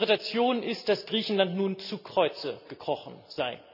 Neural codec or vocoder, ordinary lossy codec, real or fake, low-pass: none; none; real; 5.4 kHz